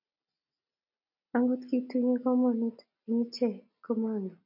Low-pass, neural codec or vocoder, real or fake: 5.4 kHz; none; real